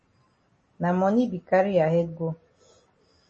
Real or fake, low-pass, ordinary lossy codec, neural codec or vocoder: real; 10.8 kHz; MP3, 32 kbps; none